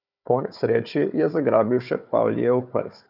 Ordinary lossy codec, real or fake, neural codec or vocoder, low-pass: none; fake; codec, 16 kHz, 4 kbps, FunCodec, trained on Chinese and English, 50 frames a second; 5.4 kHz